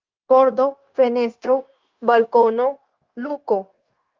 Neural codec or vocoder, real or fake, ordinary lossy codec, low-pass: codec, 16 kHz, 0.9 kbps, LongCat-Audio-Codec; fake; Opus, 32 kbps; 7.2 kHz